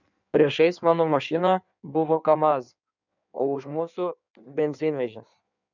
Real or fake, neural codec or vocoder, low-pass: fake; codec, 16 kHz in and 24 kHz out, 1.1 kbps, FireRedTTS-2 codec; 7.2 kHz